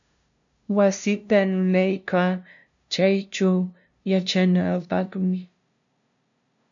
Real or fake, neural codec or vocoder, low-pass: fake; codec, 16 kHz, 0.5 kbps, FunCodec, trained on LibriTTS, 25 frames a second; 7.2 kHz